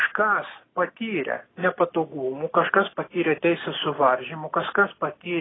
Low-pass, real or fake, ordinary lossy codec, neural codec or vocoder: 7.2 kHz; real; AAC, 16 kbps; none